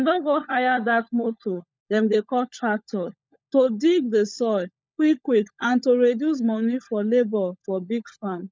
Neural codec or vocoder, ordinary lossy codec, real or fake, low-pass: codec, 16 kHz, 16 kbps, FunCodec, trained on LibriTTS, 50 frames a second; none; fake; none